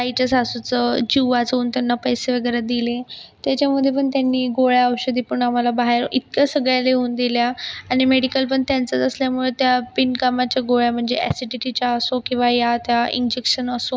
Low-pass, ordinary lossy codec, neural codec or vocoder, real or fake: none; none; none; real